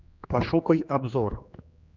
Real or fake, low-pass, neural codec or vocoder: fake; 7.2 kHz; codec, 16 kHz, 2 kbps, X-Codec, HuBERT features, trained on general audio